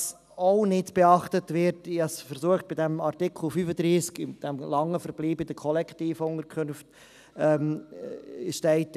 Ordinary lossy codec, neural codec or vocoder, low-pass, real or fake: none; none; 14.4 kHz; real